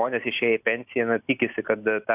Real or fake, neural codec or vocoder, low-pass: real; none; 3.6 kHz